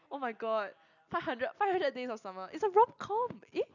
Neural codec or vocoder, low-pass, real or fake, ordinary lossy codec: none; 7.2 kHz; real; none